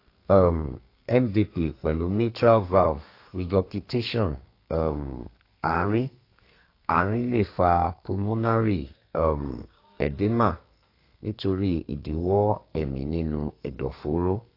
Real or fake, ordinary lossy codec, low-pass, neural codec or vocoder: fake; AAC, 32 kbps; 5.4 kHz; codec, 32 kHz, 1.9 kbps, SNAC